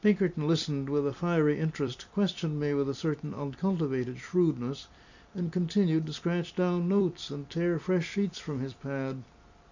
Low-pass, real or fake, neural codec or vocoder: 7.2 kHz; fake; vocoder, 44.1 kHz, 128 mel bands every 256 samples, BigVGAN v2